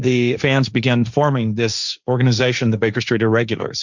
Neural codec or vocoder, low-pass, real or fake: codec, 16 kHz, 1.1 kbps, Voila-Tokenizer; 7.2 kHz; fake